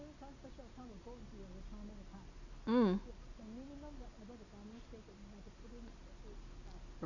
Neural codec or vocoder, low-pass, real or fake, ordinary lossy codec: none; 7.2 kHz; real; none